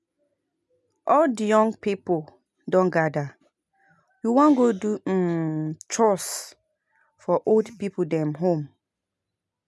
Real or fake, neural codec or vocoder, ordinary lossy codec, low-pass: real; none; none; none